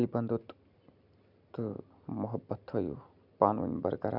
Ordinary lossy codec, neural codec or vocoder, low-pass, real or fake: none; none; 5.4 kHz; real